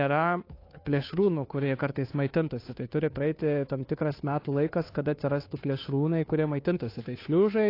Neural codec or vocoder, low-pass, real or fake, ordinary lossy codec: codec, 16 kHz, 2 kbps, FunCodec, trained on Chinese and English, 25 frames a second; 5.4 kHz; fake; AAC, 32 kbps